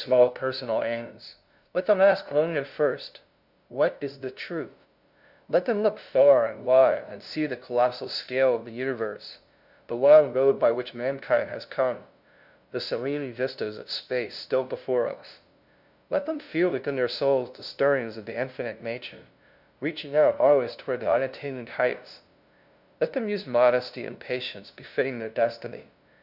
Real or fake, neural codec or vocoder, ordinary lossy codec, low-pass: fake; codec, 16 kHz, 0.5 kbps, FunCodec, trained on LibriTTS, 25 frames a second; Opus, 64 kbps; 5.4 kHz